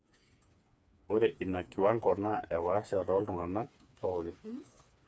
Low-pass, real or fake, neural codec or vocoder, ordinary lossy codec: none; fake; codec, 16 kHz, 4 kbps, FreqCodec, smaller model; none